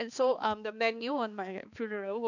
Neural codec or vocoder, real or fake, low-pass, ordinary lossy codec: codec, 16 kHz, 2 kbps, X-Codec, HuBERT features, trained on balanced general audio; fake; 7.2 kHz; none